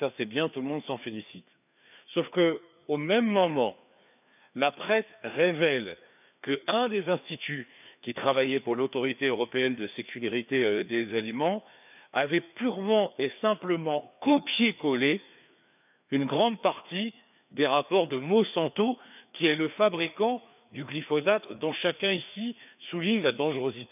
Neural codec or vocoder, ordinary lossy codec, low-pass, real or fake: codec, 16 kHz, 2 kbps, FreqCodec, larger model; none; 3.6 kHz; fake